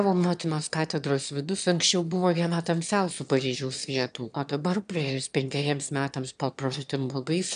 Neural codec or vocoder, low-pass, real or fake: autoencoder, 22.05 kHz, a latent of 192 numbers a frame, VITS, trained on one speaker; 9.9 kHz; fake